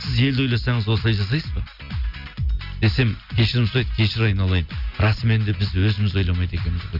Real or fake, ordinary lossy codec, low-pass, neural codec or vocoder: real; none; 5.4 kHz; none